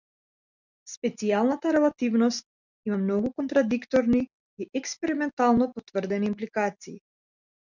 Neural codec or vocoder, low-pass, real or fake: none; 7.2 kHz; real